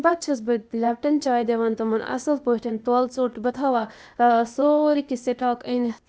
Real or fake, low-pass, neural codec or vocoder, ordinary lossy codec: fake; none; codec, 16 kHz, 0.8 kbps, ZipCodec; none